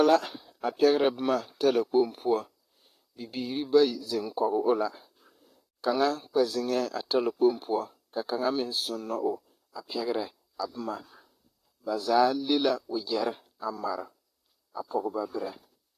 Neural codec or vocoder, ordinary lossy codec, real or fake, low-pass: vocoder, 44.1 kHz, 128 mel bands, Pupu-Vocoder; AAC, 48 kbps; fake; 14.4 kHz